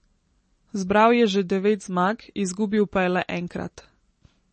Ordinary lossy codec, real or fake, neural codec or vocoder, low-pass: MP3, 32 kbps; real; none; 10.8 kHz